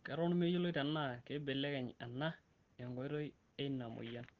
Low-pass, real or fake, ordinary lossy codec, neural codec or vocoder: 7.2 kHz; real; Opus, 16 kbps; none